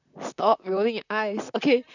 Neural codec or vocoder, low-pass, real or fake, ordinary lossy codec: vocoder, 44.1 kHz, 128 mel bands, Pupu-Vocoder; 7.2 kHz; fake; none